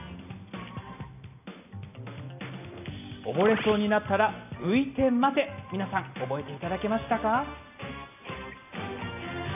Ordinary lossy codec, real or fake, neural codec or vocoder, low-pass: none; real; none; 3.6 kHz